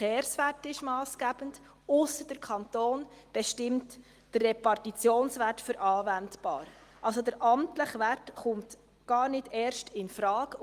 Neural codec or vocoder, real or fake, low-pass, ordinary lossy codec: none; real; 14.4 kHz; Opus, 24 kbps